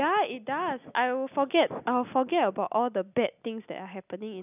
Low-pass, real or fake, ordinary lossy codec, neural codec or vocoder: 3.6 kHz; real; none; none